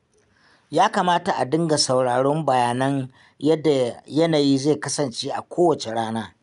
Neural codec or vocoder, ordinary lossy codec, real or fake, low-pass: none; none; real; 10.8 kHz